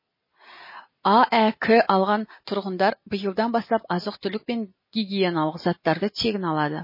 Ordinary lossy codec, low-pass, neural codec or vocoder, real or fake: MP3, 24 kbps; 5.4 kHz; none; real